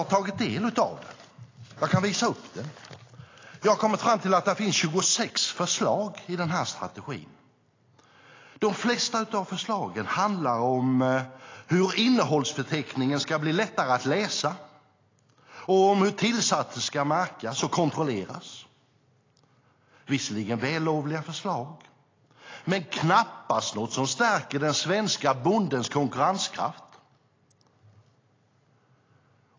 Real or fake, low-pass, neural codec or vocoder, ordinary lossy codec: real; 7.2 kHz; none; AAC, 32 kbps